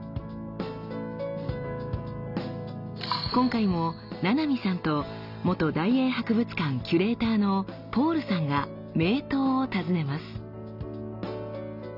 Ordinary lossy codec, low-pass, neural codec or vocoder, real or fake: none; 5.4 kHz; none; real